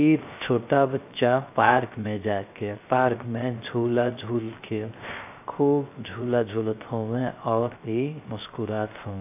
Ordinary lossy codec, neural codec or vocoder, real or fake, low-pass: AAC, 32 kbps; codec, 16 kHz, 0.3 kbps, FocalCodec; fake; 3.6 kHz